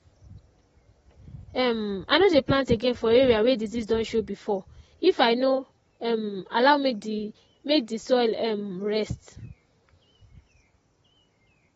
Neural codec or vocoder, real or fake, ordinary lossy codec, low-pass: none; real; AAC, 24 kbps; 19.8 kHz